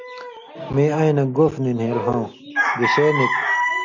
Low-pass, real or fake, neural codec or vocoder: 7.2 kHz; real; none